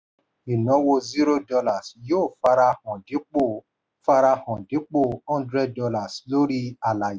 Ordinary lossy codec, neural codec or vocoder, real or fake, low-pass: none; none; real; none